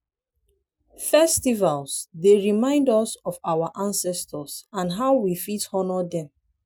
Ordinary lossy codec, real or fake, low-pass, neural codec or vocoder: none; real; none; none